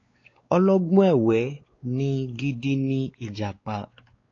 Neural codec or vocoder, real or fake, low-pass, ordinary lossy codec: codec, 16 kHz, 4 kbps, X-Codec, WavLM features, trained on Multilingual LibriSpeech; fake; 7.2 kHz; AAC, 32 kbps